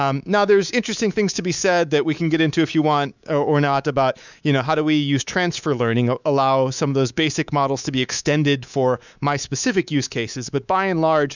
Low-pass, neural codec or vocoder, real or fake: 7.2 kHz; codec, 24 kHz, 3.1 kbps, DualCodec; fake